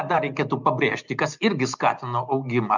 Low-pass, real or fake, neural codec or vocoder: 7.2 kHz; real; none